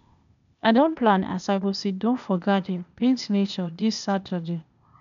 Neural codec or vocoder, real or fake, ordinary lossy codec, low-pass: codec, 16 kHz, 0.8 kbps, ZipCodec; fake; none; 7.2 kHz